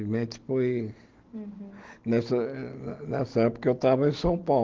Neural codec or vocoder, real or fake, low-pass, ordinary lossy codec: none; real; 7.2 kHz; Opus, 16 kbps